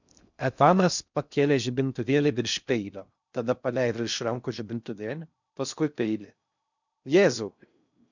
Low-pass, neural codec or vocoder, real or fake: 7.2 kHz; codec, 16 kHz in and 24 kHz out, 0.6 kbps, FocalCodec, streaming, 2048 codes; fake